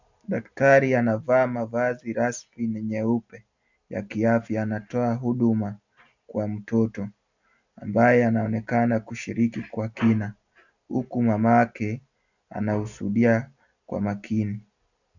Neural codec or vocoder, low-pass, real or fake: none; 7.2 kHz; real